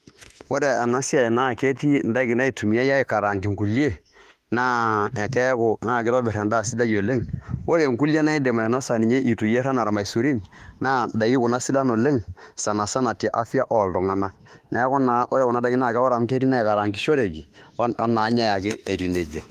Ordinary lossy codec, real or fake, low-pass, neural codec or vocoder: Opus, 32 kbps; fake; 14.4 kHz; autoencoder, 48 kHz, 32 numbers a frame, DAC-VAE, trained on Japanese speech